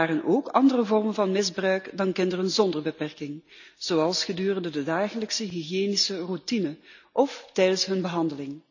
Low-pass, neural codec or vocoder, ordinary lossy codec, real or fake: 7.2 kHz; none; none; real